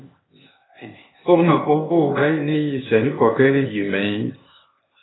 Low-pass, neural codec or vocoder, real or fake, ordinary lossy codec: 7.2 kHz; codec, 16 kHz, 0.8 kbps, ZipCodec; fake; AAC, 16 kbps